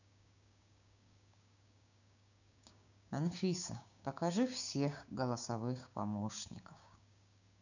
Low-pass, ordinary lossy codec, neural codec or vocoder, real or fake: 7.2 kHz; none; codec, 16 kHz, 6 kbps, DAC; fake